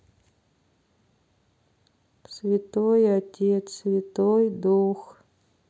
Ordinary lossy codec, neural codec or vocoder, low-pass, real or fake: none; none; none; real